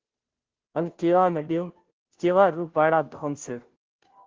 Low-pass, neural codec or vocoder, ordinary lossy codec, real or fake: 7.2 kHz; codec, 16 kHz, 0.5 kbps, FunCodec, trained on Chinese and English, 25 frames a second; Opus, 16 kbps; fake